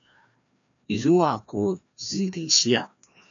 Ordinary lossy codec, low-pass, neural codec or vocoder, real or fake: MP3, 64 kbps; 7.2 kHz; codec, 16 kHz, 1 kbps, FreqCodec, larger model; fake